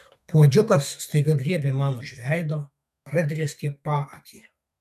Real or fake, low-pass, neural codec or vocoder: fake; 14.4 kHz; codec, 32 kHz, 1.9 kbps, SNAC